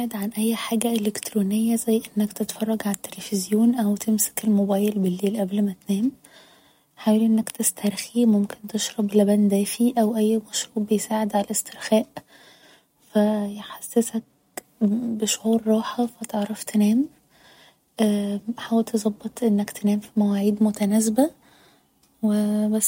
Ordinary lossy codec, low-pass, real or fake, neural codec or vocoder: MP3, 64 kbps; 19.8 kHz; real; none